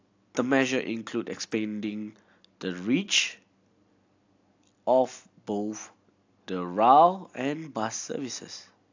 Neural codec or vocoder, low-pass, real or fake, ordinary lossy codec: none; 7.2 kHz; real; AAC, 48 kbps